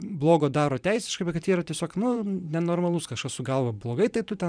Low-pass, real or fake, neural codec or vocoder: 9.9 kHz; fake; vocoder, 44.1 kHz, 128 mel bands every 512 samples, BigVGAN v2